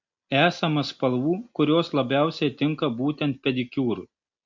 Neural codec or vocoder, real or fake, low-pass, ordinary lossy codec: none; real; 7.2 kHz; MP3, 48 kbps